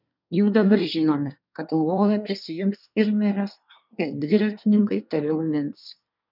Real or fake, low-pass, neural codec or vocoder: fake; 5.4 kHz; codec, 24 kHz, 1 kbps, SNAC